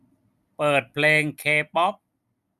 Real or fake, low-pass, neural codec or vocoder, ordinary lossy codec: real; 14.4 kHz; none; none